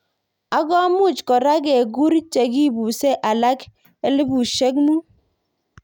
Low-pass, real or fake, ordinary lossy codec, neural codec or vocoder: 19.8 kHz; real; none; none